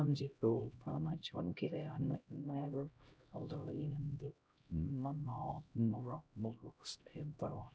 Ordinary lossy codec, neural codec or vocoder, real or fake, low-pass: none; codec, 16 kHz, 0.5 kbps, X-Codec, HuBERT features, trained on LibriSpeech; fake; none